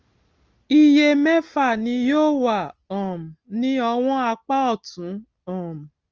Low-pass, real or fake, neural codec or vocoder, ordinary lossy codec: 7.2 kHz; real; none; Opus, 24 kbps